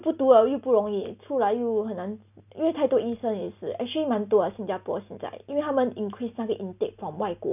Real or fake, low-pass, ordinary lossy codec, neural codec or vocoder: real; 3.6 kHz; none; none